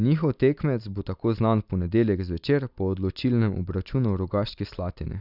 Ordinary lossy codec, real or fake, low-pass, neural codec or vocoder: none; real; 5.4 kHz; none